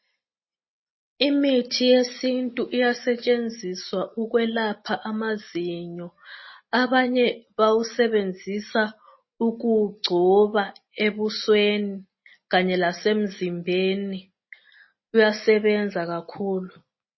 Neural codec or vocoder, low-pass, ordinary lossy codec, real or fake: none; 7.2 kHz; MP3, 24 kbps; real